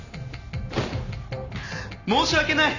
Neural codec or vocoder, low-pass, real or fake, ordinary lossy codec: none; 7.2 kHz; real; none